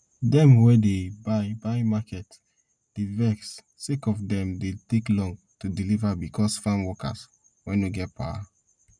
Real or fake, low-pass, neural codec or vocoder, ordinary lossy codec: real; 9.9 kHz; none; none